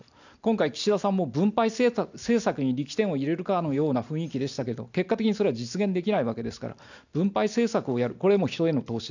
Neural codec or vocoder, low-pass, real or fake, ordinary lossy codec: none; 7.2 kHz; real; none